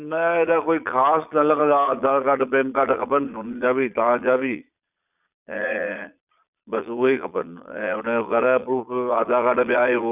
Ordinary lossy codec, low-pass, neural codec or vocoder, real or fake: none; 3.6 kHz; vocoder, 22.05 kHz, 80 mel bands, Vocos; fake